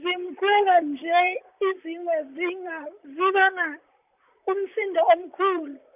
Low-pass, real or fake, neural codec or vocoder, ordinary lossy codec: 3.6 kHz; fake; vocoder, 44.1 kHz, 128 mel bands, Pupu-Vocoder; none